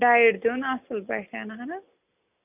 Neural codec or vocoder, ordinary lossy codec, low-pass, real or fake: none; AAC, 32 kbps; 3.6 kHz; real